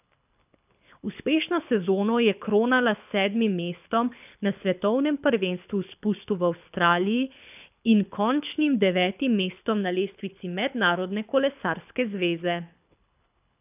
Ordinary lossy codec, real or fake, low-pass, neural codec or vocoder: none; fake; 3.6 kHz; codec, 24 kHz, 6 kbps, HILCodec